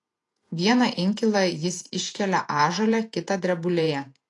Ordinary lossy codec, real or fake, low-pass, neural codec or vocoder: AAC, 48 kbps; fake; 10.8 kHz; vocoder, 48 kHz, 128 mel bands, Vocos